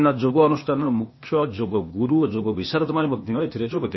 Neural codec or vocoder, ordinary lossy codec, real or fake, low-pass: codec, 16 kHz, 0.8 kbps, ZipCodec; MP3, 24 kbps; fake; 7.2 kHz